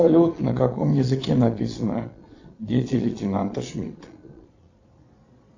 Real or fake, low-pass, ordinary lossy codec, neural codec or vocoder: fake; 7.2 kHz; AAC, 48 kbps; vocoder, 22.05 kHz, 80 mel bands, Vocos